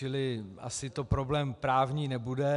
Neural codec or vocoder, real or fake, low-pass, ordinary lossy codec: none; real; 10.8 kHz; AAC, 96 kbps